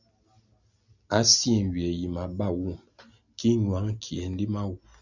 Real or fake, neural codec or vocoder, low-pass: real; none; 7.2 kHz